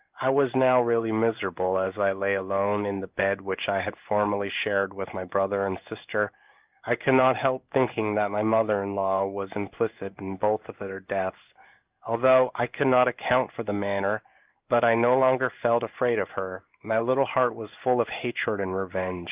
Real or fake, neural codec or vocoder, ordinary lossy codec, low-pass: fake; codec, 16 kHz in and 24 kHz out, 1 kbps, XY-Tokenizer; Opus, 32 kbps; 3.6 kHz